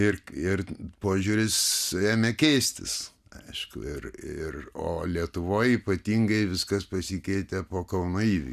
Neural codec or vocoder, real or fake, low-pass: none; real; 14.4 kHz